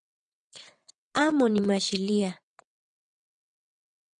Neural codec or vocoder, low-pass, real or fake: vocoder, 22.05 kHz, 80 mel bands, WaveNeXt; 9.9 kHz; fake